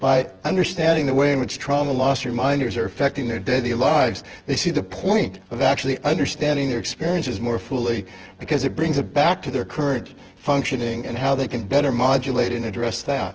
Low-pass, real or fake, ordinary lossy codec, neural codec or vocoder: 7.2 kHz; fake; Opus, 16 kbps; vocoder, 24 kHz, 100 mel bands, Vocos